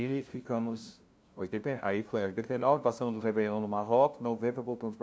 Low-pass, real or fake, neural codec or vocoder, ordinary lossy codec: none; fake; codec, 16 kHz, 0.5 kbps, FunCodec, trained on LibriTTS, 25 frames a second; none